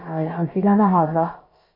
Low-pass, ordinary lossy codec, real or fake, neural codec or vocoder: 5.4 kHz; MP3, 24 kbps; fake; codec, 16 kHz, about 1 kbps, DyCAST, with the encoder's durations